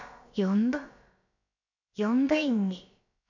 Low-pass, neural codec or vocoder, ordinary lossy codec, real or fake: 7.2 kHz; codec, 16 kHz, about 1 kbps, DyCAST, with the encoder's durations; none; fake